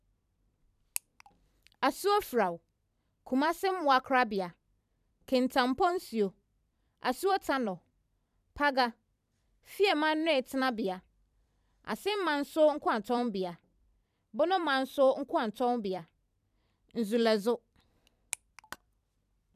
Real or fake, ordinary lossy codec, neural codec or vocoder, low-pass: fake; none; vocoder, 44.1 kHz, 128 mel bands every 256 samples, BigVGAN v2; 14.4 kHz